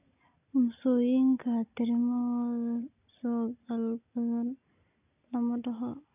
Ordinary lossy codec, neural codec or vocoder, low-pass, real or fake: none; none; 3.6 kHz; real